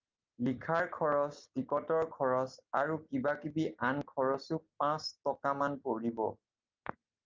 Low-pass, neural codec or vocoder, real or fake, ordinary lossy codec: 7.2 kHz; none; real; Opus, 24 kbps